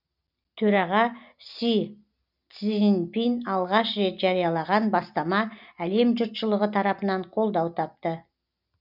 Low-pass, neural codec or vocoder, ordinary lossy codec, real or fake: 5.4 kHz; none; none; real